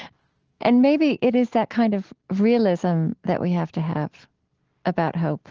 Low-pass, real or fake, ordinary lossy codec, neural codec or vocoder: 7.2 kHz; real; Opus, 16 kbps; none